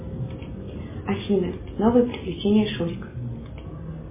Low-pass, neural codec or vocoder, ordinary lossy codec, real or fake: 3.6 kHz; none; MP3, 16 kbps; real